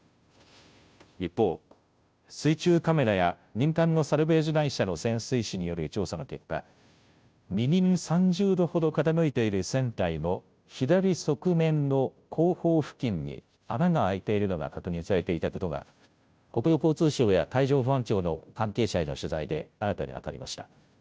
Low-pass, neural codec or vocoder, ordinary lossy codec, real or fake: none; codec, 16 kHz, 0.5 kbps, FunCodec, trained on Chinese and English, 25 frames a second; none; fake